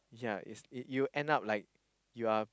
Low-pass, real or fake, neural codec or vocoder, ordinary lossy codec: none; real; none; none